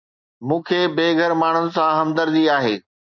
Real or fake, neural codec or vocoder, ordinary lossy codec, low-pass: real; none; MP3, 64 kbps; 7.2 kHz